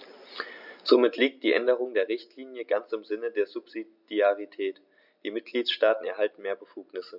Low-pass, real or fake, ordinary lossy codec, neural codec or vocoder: 5.4 kHz; real; none; none